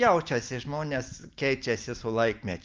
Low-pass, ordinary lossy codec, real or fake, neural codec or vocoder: 7.2 kHz; Opus, 24 kbps; real; none